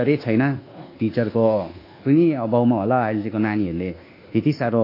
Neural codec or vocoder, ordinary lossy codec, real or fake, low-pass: codec, 24 kHz, 1.2 kbps, DualCodec; AAC, 32 kbps; fake; 5.4 kHz